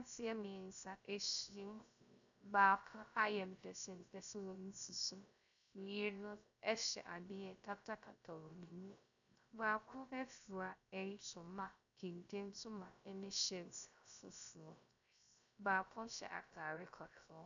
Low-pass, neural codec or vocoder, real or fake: 7.2 kHz; codec, 16 kHz, 0.3 kbps, FocalCodec; fake